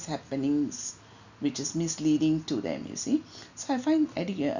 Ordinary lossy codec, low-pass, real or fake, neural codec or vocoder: none; 7.2 kHz; real; none